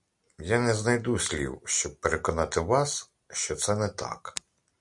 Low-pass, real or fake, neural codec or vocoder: 10.8 kHz; real; none